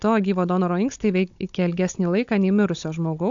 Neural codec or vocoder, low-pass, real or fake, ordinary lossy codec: codec, 16 kHz, 16 kbps, FunCodec, trained on Chinese and English, 50 frames a second; 7.2 kHz; fake; AAC, 64 kbps